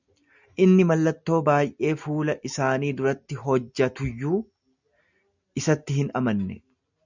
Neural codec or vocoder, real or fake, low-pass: none; real; 7.2 kHz